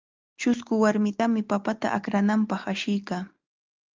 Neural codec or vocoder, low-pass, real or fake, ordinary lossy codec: none; 7.2 kHz; real; Opus, 24 kbps